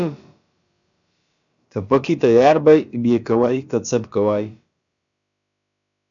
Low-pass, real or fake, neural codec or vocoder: 7.2 kHz; fake; codec, 16 kHz, about 1 kbps, DyCAST, with the encoder's durations